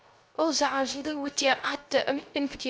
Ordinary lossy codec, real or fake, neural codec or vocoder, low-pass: none; fake; codec, 16 kHz, 0.3 kbps, FocalCodec; none